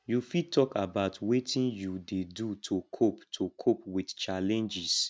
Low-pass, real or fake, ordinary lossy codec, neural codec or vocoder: none; real; none; none